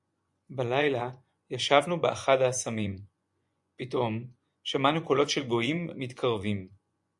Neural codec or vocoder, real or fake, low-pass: vocoder, 24 kHz, 100 mel bands, Vocos; fake; 10.8 kHz